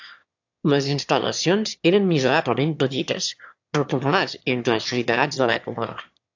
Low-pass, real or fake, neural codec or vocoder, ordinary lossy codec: 7.2 kHz; fake; autoencoder, 22.05 kHz, a latent of 192 numbers a frame, VITS, trained on one speaker; AAC, 48 kbps